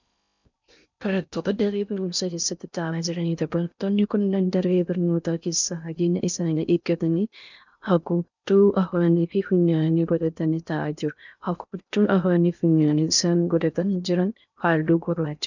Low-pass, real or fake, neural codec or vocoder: 7.2 kHz; fake; codec, 16 kHz in and 24 kHz out, 0.6 kbps, FocalCodec, streaming, 4096 codes